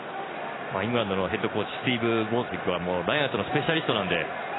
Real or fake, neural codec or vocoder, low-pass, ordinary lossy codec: real; none; 7.2 kHz; AAC, 16 kbps